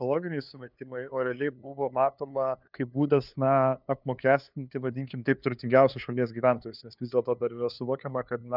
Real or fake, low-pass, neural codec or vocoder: fake; 5.4 kHz; codec, 16 kHz, 2 kbps, FunCodec, trained on LibriTTS, 25 frames a second